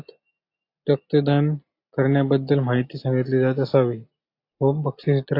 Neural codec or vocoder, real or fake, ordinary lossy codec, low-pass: none; real; AAC, 32 kbps; 5.4 kHz